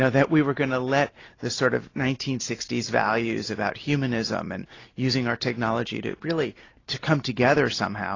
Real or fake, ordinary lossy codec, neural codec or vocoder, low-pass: real; AAC, 32 kbps; none; 7.2 kHz